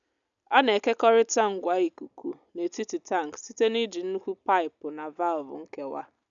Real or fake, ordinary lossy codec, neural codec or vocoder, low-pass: real; none; none; 7.2 kHz